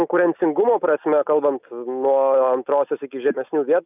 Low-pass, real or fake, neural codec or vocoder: 3.6 kHz; real; none